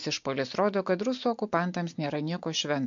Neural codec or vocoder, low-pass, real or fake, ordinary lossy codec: none; 7.2 kHz; real; MP3, 48 kbps